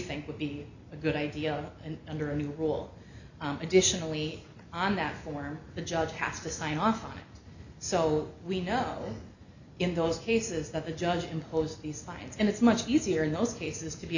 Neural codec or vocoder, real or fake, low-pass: none; real; 7.2 kHz